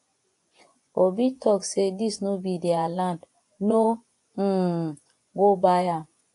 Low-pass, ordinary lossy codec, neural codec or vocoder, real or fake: 10.8 kHz; AAC, 48 kbps; vocoder, 24 kHz, 100 mel bands, Vocos; fake